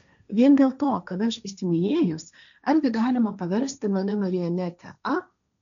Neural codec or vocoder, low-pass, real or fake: codec, 16 kHz, 1.1 kbps, Voila-Tokenizer; 7.2 kHz; fake